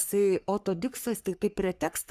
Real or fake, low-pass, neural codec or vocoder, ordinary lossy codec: fake; 14.4 kHz; codec, 44.1 kHz, 3.4 kbps, Pupu-Codec; Opus, 64 kbps